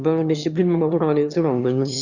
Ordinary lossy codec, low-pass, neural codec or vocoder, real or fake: Opus, 64 kbps; 7.2 kHz; autoencoder, 22.05 kHz, a latent of 192 numbers a frame, VITS, trained on one speaker; fake